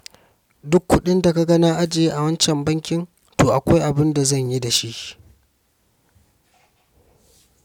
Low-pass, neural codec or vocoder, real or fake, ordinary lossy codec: 19.8 kHz; none; real; none